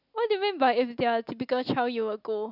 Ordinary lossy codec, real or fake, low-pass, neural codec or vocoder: none; real; 5.4 kHz; none